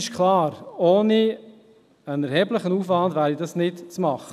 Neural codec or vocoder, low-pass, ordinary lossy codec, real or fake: none; 14.4 kHz; none; real